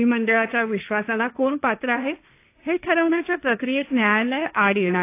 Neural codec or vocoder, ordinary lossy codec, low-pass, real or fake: codec, 16 kHz, 1.1 kbps, Voila-Tokenizer; AAC, 24 kbps; 3.6 kHz; fake